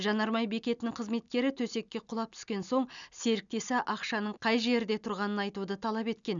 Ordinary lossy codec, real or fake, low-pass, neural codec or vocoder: none; real; 7.2 kHz; none